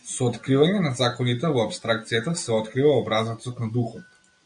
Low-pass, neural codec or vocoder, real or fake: 9.9 kHz; none; real